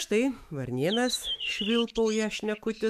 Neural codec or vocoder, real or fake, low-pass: autoencoder, 48 kHz, 128 numbers a frame, DAC-VAE, trained on Japanese speech; fake; 14.4 kHz